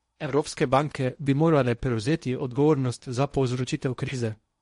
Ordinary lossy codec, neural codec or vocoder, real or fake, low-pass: MP3, 48 kbps; codec, 16 kHz in and 24 kHz out, 0.8 kbps, FocalCodec, streaming, 65536 codes; fake; 10.8 kHz